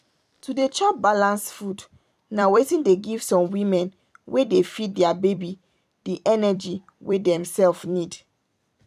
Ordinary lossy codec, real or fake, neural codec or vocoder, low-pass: none; fake; vocoder, 44.1 kHz, 128 mel bands every 256 samples, BigVGAN v2; 14.4 kHz